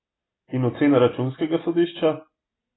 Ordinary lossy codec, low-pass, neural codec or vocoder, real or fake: AAC, 16 kbps; 7.2 kHz; none; real